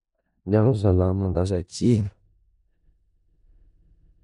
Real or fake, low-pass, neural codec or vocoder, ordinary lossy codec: fake; 10.8 kHz; codec, 16 kHz in and 24 kHz out, 0.4 kbps, LongCat-Audio-Codec, four codebook decoder; none